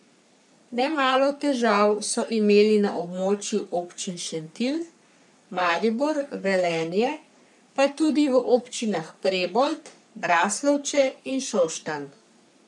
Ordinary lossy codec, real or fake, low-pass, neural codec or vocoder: none; fake; 10.8 kHz; codec, 44.1 kHz, 3.4 kbps, Pupu-Codec